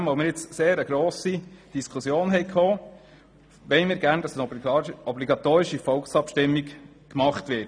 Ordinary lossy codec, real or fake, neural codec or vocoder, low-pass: none; real; none; 9.9 kHz